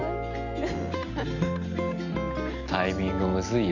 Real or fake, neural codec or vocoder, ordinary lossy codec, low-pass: real; none; none; 7.2 kHz